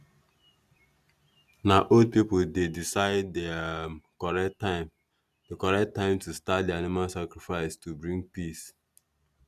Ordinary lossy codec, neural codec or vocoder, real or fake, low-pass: none; none; real; 14.4 kHz